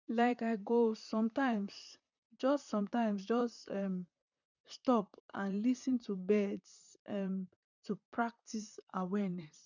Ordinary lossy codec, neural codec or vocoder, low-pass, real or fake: none; vocoder, 44.1 kHz, 128 mel bands, Pupu-Vocoder; 7.2 kHz; fake